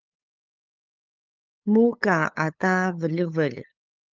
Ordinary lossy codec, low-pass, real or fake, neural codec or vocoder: Opus, 24 kbps; 7.2 kHz; fake; codec, 16 kHz, 8 kbps, FunCodec, trained on LibriTTS, 25 frames a second